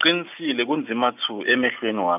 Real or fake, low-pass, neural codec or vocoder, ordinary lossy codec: real; 3.6 kHz; none; none